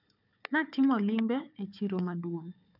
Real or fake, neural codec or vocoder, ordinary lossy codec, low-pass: fake; codec, 16 kHz, 4 kbps, FunCodec, trained on Chinese and English, 50 frames a second; none; 5.4 kHz